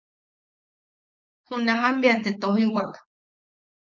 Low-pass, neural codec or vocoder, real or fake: 7.2 kHz; codec, 16 kHz, 4.8 kbps, FACodec; fake